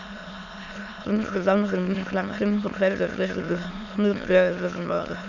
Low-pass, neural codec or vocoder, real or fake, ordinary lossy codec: 7.2 kHz; autoencoder, 22.05 kHz, a latent of 192 numbers a frame, VITS, trained on many speakers; fake; none